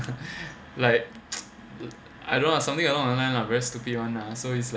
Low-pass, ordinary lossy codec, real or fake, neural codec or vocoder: none; none; real; none